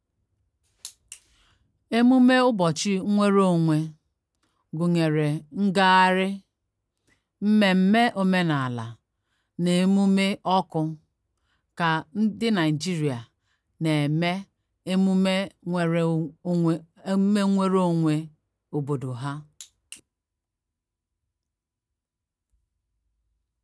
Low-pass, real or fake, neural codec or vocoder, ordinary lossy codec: none; real; none; none